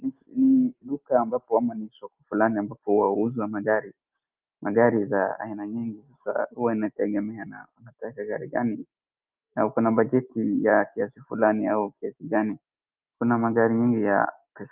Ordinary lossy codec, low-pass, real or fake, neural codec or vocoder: Opus, 32 kbps; 3.6 kHz; real; none